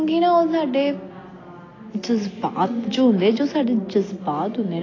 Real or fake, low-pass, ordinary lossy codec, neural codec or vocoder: real; 7.2 kHz; AAC, 32 kbps; none